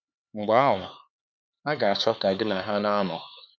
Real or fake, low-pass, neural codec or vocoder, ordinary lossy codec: fake; none; codec, 16 kHz, 4 kbps, X-Codec, HuBERT features, trained on LibriSpeech; none